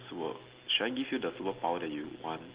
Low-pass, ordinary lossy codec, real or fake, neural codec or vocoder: 3.6 kHz; Opus, 16 kbps; real; none